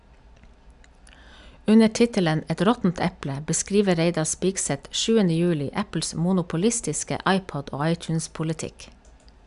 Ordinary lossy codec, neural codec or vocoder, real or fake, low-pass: none; none; real; 10.8 kHz